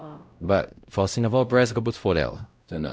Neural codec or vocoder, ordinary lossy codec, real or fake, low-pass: codec, 16 kHz, 0.5 kbps, X-Codec, WavLM features, trained on Multilingual LibriSpeech; none; fake; none